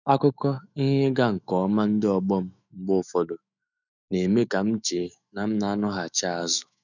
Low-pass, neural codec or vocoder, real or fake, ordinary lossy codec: 7.2 kHz; autoencoder, 48 kHz, 128 numbers a frame, DAC-VAE, trained on Japanese speech; fake; none